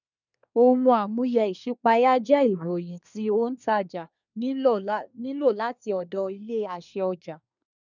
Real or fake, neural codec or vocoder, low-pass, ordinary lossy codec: fake; codec, 24 kHz, 1 kbps, SNAC; 7.2 kHz; none